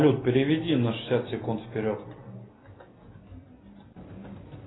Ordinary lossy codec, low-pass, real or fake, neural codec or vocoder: AAC, 16 kbps; 7.2 kHz; real; none